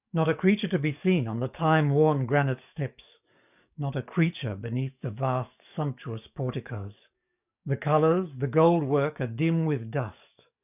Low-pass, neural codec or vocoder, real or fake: 3.6 kHz; codec, 44.1 kHz, 7.8 kbps, DAC; fake